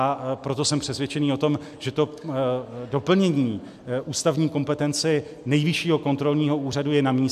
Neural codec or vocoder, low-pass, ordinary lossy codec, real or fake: none; 14.4 kHz; MP3, 96 kbps; real